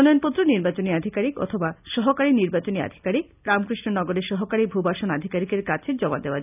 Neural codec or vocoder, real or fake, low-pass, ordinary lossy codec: none; real; 3.6 kHz; none